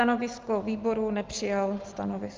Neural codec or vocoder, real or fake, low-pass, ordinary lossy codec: none; real; 7.2 kHz; Opus, 32 kbps